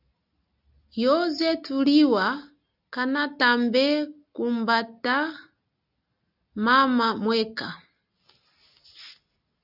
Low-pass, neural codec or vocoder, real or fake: 5.4 kHz; none; real